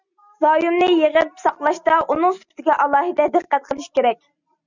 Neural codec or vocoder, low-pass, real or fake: none; 7.2 kHz; real